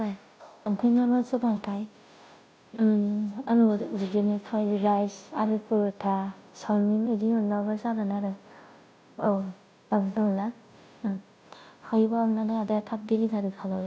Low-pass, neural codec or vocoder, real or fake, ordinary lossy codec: none; codec, 16 kHz, 0.5 kbps, FunCodec, trained on Chinese and English, 25 frames a second; fake; none